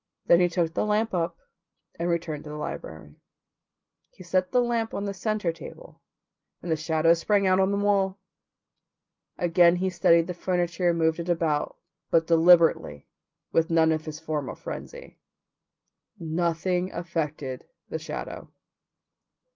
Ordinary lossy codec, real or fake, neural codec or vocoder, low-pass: Opus, 24 kbps; real; none; 7.2 kHz